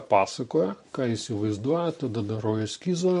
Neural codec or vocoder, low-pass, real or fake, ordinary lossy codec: vocoder, 44.1 kHz, 128 mel bands every 512 samples, BigVGAN v2; 14.4 kHz; fake; MP3, 48 kbps